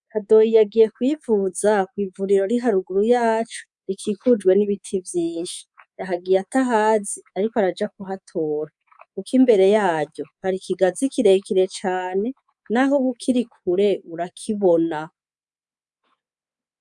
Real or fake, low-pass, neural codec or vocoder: fake; 10.8 kHz; codec, 24 kHz, 3.1 kbps, DualCodec